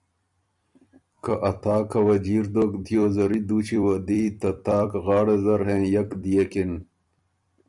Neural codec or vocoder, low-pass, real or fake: vocoder, 44.1 kHz, 128 mel bands every 256 samples, BigVGAN v2; 10.8 kHz; fake